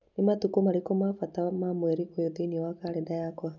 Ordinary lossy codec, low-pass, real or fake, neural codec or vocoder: none; 7.2 kHz; real; none